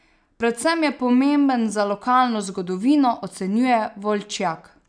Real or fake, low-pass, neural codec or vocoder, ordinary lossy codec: real; 9.9 kHz; none; none